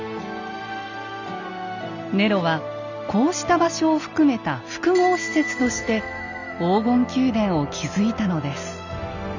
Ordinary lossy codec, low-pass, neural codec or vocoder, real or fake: none; 7.2 kHz; none; real